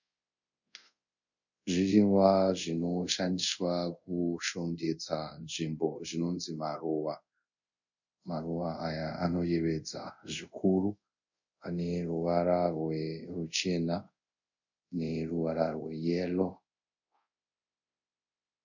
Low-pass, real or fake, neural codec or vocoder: 7.2 kHz; fake; codec, 24 kHz, 0.5 kbps, DualCodec